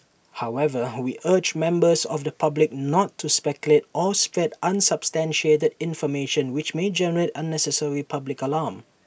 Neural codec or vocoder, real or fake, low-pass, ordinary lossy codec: none; real; none; none